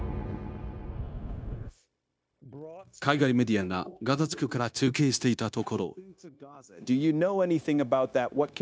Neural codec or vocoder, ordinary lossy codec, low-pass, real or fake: codec, 16 kHz, 0.9 kbps, LongCat-Audio-Codec; none; none; fake